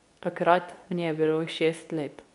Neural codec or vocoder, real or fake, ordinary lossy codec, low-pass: codec, 24 kHz, 0.9 kbps, WavTokenizer, medium speech release version 2; fake; none; 10.8 kHz